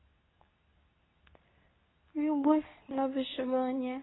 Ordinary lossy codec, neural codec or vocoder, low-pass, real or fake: AAC, 16 kbps; codec, 24 kHz, 0.9 kbps, WavTokenizer, medium speech release version 2; 7.2 kHz; fake